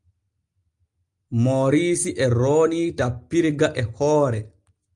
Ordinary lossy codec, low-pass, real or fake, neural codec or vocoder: Opus, 32 kbps; 10.8 kHz; real; none